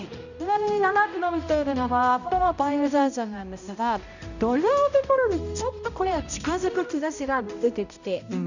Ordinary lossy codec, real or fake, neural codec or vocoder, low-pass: none; fake; codec, 16 kHz, 0.5 kbps, X-Codec, HuBERT features, trained on balanced general audio; 7.2 kHz